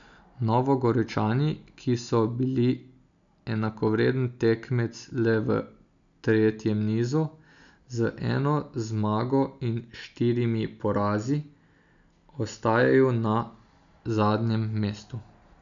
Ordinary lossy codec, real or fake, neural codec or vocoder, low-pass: none; real; none; 7.2 kHz